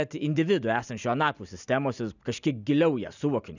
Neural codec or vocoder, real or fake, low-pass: none; real; 7.2 kHz